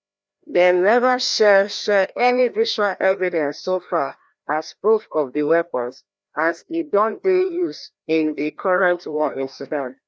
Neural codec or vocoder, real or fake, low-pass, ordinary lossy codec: codec, 16 kHz, 1 kbps, FreqCodec, larger model; fake; none; none